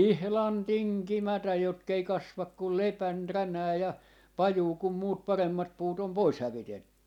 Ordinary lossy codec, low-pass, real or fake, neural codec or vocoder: none; 19.8 kHz; real; none